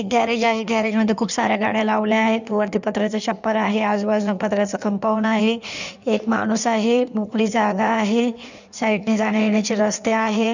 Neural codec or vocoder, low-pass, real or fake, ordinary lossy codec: codec, 16 kHz in and 24 kHz out, 1.1 kbps, FireRedTTS-2 codec; 7.2 kHz; fake; none